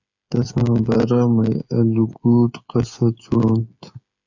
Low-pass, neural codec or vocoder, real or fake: 7.2 kHz; codec, 16 kHz, 8 kbps, FreqCodec, smaller model; fake